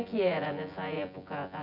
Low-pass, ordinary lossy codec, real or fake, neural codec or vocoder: 5.4 kHz; none; fake; vocoder, 24 kHz, 100 mel bands, Vocos